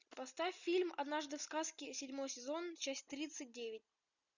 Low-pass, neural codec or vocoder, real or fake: 7.2 kHz; none; real